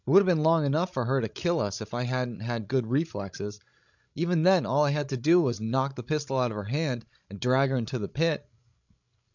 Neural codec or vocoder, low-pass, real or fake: codec, 16 kHz, 16 kbps, FreqCodec, larger model; 7.2 kHz; fake